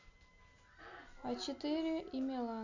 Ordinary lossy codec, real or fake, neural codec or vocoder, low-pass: none; real; none; 7.2 kHz